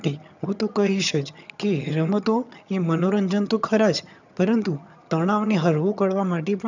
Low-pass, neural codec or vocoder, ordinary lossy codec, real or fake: 7.2 kHz; vocoder, 22.05 kHz, 80 mel bands, HiFi-GAN; none; fake